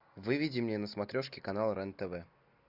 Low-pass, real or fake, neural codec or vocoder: 5.4 kHz; real; none